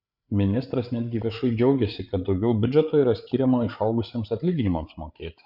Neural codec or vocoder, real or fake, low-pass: codec, 16 kHz, 8 kbps, FreqCodec, larger model; fake; 5.4 kHz